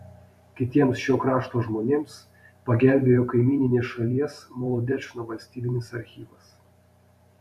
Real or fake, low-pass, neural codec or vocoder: fake; 14.4 kHz; vocoder, 44.1 kHz, 128 mel bands every 512 samples, BigVGAN v2